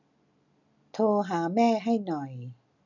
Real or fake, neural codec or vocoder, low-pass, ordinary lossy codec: real; none; 7.2 kHz; none